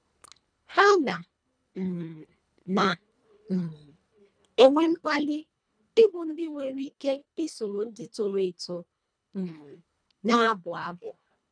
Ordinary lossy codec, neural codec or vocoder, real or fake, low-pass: none; codec, 24 kHz, 1.5 kbps, HILCodec; fake; 9.9 kHz